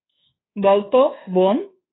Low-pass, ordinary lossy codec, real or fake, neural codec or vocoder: 7.2 kHz; AAC, 16 kbps; fake; codec, 24 kHz, 1.2 kbps, DualCodec